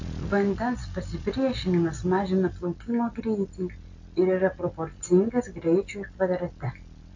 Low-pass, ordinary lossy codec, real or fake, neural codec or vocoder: 7.2 kHz; AAC, 48 kbps; real; none